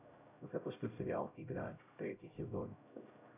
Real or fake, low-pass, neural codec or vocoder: fake; 3.6 kHz; codec, 16 kHz, 0.5 kbps, X-Codec, HuBERT features, trained on LibriSpeech